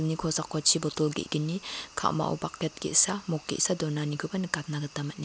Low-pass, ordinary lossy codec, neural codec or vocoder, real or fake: none; none; none; real